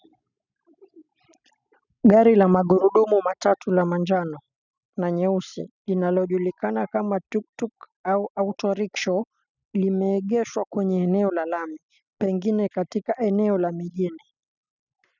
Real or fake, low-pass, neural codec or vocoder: real; 7.2 kHz; none